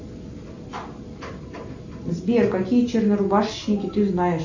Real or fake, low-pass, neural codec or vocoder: real; 7.2 kHz; none